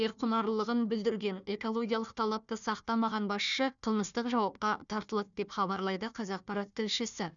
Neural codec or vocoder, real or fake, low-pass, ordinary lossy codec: codec, 16 kHz, 1 kbps, FunCodec, trained on Chinese and English, 50 frames a second; fake; 7.2 kHz; none